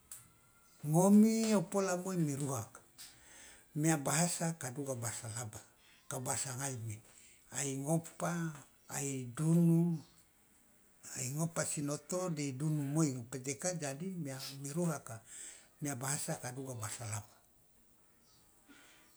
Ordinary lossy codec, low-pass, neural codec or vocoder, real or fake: none; none; none; real